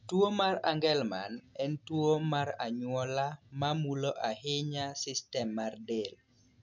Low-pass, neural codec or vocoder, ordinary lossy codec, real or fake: 7.2 kHz; none; MP3, 64 kbps; real